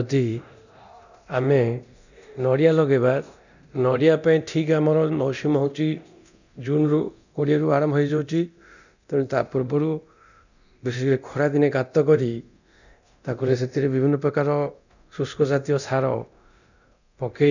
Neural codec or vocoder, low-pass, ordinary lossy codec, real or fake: codec, 24 kHz, 0.9 kbps, DualCodec; 7.2 kHz; none; fake